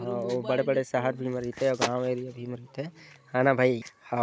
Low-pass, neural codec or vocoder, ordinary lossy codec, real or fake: none; none; none; real